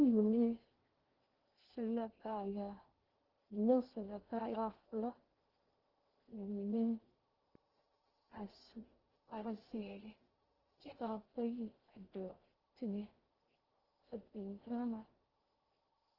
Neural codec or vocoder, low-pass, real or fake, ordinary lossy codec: codec, 16 kHz in and 24 kHz out, 0.6 kbps, FocalCodec, streaming, 2048 codes; 5.4 kHz; fake; Opus, 16 kbps